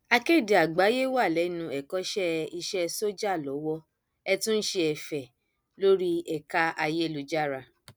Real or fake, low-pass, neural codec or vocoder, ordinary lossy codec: real; none; none; none